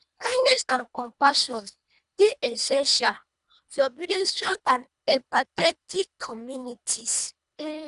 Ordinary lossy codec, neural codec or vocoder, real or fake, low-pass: none; codec, 24 kHz, 1.5 kbps, HILCodec; fake; 10.8 kHz